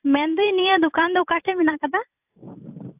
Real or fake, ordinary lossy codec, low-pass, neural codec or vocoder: fake; AAC, 24 kbps; 3.6 kHz; vocoder, 44.1 kHz, 128 mel bands every 256 samples, BigVGAN v2